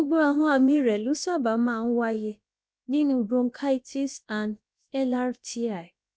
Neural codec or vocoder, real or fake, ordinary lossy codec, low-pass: codec, 16 kHz, about 1 kbps, DyCAST, with the encoder's durations; fake; none; none